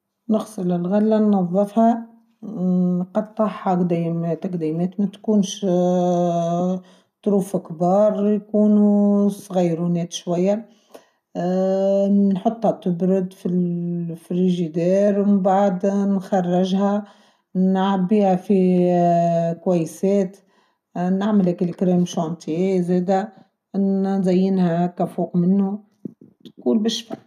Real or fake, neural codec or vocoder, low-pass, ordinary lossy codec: real; none; 14.4 kHz; none